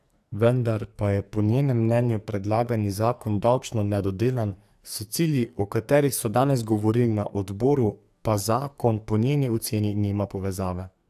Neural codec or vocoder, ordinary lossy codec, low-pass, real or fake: codec, 44.1 kHz, 2.6 kbps, SNAC; AAC, 96 kbps; 14.4 kHz; fake